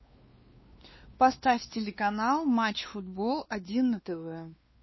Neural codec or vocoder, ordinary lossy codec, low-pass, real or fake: codec, 16 kHz, 2 kbps, X-Codec, WavLM features, trained on Multilingual LibriSpeech; MP3, 24 kbps; 7.2 kHz; fake